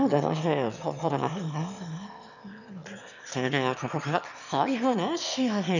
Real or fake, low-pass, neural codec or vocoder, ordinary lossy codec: fake; 7.2 kHz; autoencoder, 22.05 kHz, a latent of 192 numbers a frame, VITS, trained on one speaker; none